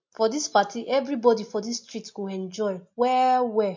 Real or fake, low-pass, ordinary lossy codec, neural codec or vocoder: real; 7.2 kHz; MP3, 48 kbps; none